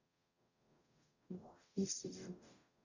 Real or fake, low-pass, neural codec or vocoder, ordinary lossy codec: fake; 7.2 kHz; codec, 44.1 kHz, 0.9 kbps, DAC; none